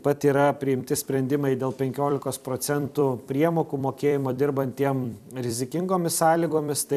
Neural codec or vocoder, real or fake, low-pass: vocoder, 44.1 kHz, 128 mel bands every 256 samples, BigVGAN v2; fake; 14.4 kHz